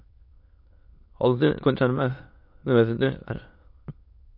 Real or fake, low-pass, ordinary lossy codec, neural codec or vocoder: fake; 5.4 kHz; MP3, 32 kbps; autoencoder, 22.05 kHz, a latent of 192 numbers a frame, VITS, trained on many speakers